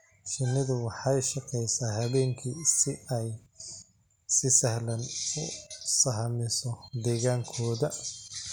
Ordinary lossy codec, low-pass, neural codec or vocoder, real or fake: none; none; none; real